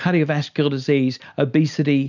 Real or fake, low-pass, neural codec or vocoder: real; 7.2 kHz; none